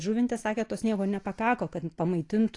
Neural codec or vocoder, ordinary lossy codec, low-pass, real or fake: none; AAC, 48 kbps; 10.8 kHz; real